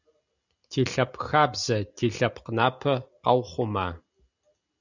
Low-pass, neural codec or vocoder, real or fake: 7.2 kHz; none; real